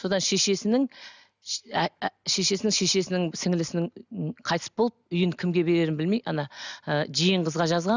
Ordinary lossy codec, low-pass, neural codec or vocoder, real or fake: none; 7.2 kHz; none; real